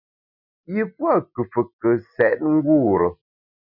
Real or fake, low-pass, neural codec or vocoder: real; 5.4 kHz; none